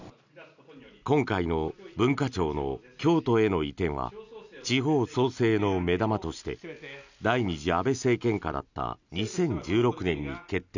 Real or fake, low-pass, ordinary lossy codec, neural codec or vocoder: real; 7.2 kHz; none; none